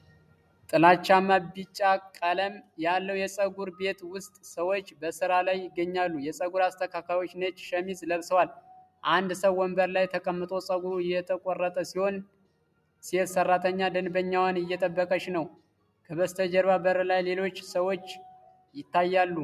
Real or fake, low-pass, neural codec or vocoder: real; 14.4 kHz; none